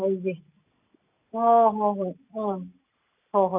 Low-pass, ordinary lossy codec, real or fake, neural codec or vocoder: 3.6 kHz; none; real; none